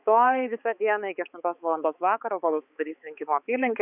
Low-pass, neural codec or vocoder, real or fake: 3.6 kHz; codec, 16 kHz, 4 kbps, X-Codec, HuBERT features, trained on balanced general audio; fake